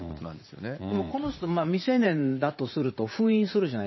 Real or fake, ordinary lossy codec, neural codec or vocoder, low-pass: fake; MP3, 24 kbps; vocoder, 44.1 kHz, 80 mel bands, Vocos; 7.2 kHz